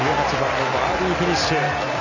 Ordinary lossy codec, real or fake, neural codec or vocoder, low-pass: none; real; none; 7.2 kHz